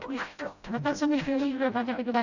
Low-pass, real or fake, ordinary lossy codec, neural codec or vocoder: 7.2 kHz; fake; none; codec, 16 kHz, 0.5 kbps, FreqCodec, smaller model